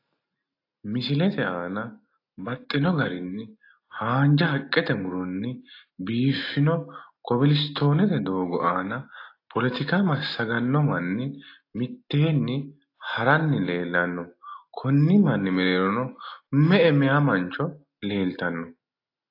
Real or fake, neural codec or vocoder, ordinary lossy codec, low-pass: real; none; AAC, 32 kbps; 5.4 kHz